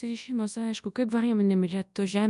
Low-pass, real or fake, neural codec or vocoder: 10.8 kHz; fake; codec, 24 kHz, 0.9 kbps, WavTokenizer, large speech release